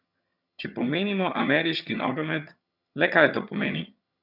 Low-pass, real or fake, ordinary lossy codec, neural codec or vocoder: 5.4 kHz; fake; none; vocoder, 22.05 kHz, 80 mel bands, HiFi-GAN